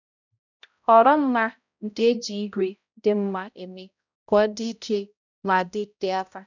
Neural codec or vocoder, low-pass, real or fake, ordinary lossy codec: codec, 16 kHz, 0.5 kbps, X-Codec, HuBERT features, trained on balanced general audio; 7.2 kHz; fake; none